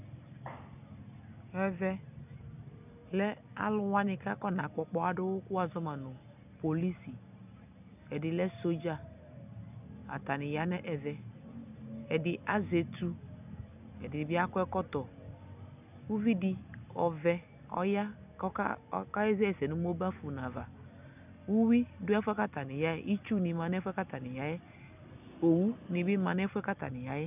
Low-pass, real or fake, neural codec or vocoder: 3.6 kHz; real; none